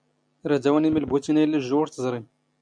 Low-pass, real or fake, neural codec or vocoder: 9.9 kHz; real; none